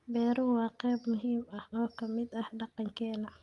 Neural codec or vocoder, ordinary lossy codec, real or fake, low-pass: none; Opus, 32 kbps; real; 10.8 kHz